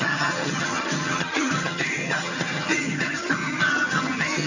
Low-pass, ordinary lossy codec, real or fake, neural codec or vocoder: 7.2 kHz; none; fake; vocoder, 22.05 kHz, 80 mel bands, HiFi-GAN